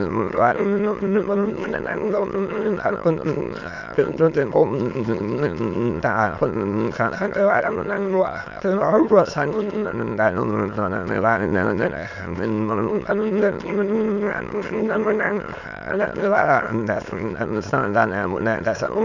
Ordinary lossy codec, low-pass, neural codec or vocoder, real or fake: none; 7.2 kHz; autoencoder, 22.05 kHz, a latent of 192 numbers a frame, VITS, trained on many speakers; fake